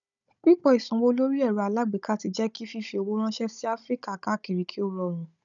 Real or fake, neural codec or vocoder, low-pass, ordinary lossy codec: fake; codec, 16 kHz, 16 kbps, FunCodec, trained on Chinese and English, 50 frames a second; 7.2 kHz; none